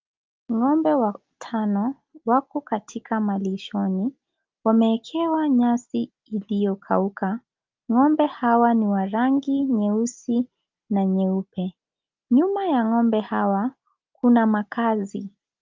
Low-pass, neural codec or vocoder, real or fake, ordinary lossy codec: 7.2 kHz; none; real; Opus, 24 kbps